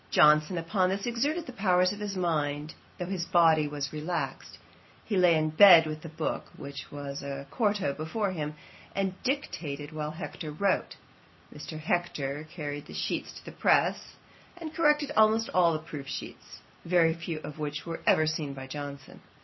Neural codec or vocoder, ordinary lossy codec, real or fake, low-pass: none; MP3, 24 kbps; real; 7.2 kHz